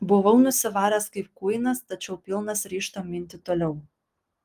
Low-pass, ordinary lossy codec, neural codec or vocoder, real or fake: 14.4 kHz; Opus, 24 kbps; none; real